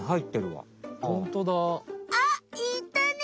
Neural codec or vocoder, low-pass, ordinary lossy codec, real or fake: none; none; none; real